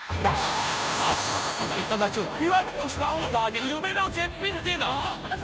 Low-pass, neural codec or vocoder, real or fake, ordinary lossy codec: none; codec, 16 kHz, 0.5 kbps, FunCodec, trained on Chinese and English, 25 frames a second; fake; none